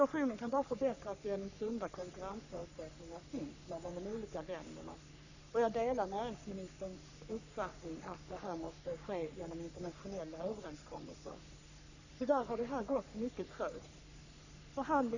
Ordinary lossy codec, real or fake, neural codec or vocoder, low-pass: none; fake; codec, 44.1 kHz, 3.4 kbps, Pupu-Codec; 7.2 kHz